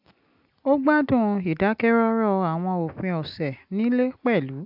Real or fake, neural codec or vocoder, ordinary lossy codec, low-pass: real; none; AAC, 48 kbps; 5.4 kHz